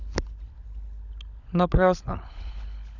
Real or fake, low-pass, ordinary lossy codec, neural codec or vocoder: fake; 7.2 kHz; none; codec, 16 kHz, 16 kbps, FunCodec, trained on LibriTTS, 50 frames a second